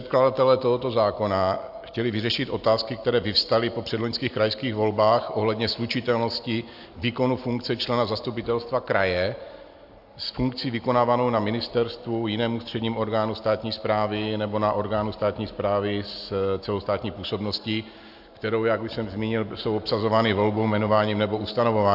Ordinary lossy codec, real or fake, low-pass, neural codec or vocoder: AAC, 48 kbps; real; 5.4 kHz; none